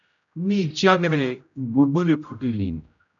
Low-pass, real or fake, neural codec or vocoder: 7.2 kHz; fake; codec, 16 kHz, 0.5 kbps, X-Codec, HuBERT features, trained on general audio